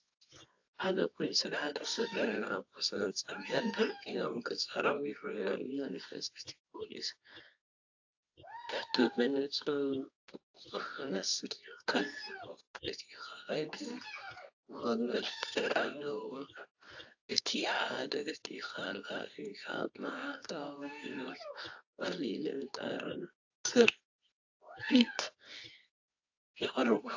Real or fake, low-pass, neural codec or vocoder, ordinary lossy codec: fake; 7.2 kHz; codec, 24 kHz, 0.9 kbps, WavTokenizer, medium music audio release; AAC, 48 kbps